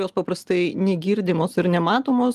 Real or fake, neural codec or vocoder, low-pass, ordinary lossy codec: real; none; 14.4 kHz; Opus, 24 kbps